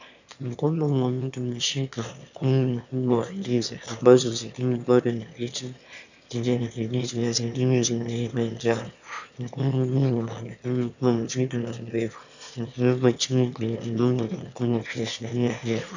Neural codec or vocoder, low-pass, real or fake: autoencoder, 22.05 kHz, a latent of 192 numbers a frame, VITS, trained on one speaker; 7.2 kHz; fake